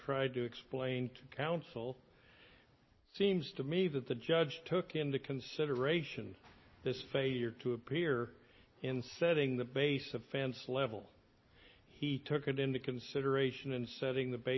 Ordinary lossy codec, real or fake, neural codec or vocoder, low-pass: MP3, 24 kbps; real; none; 7.2 kHz